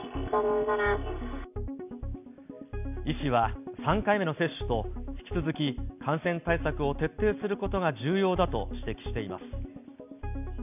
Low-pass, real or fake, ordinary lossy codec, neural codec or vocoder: 3.6 kHz; real; none; none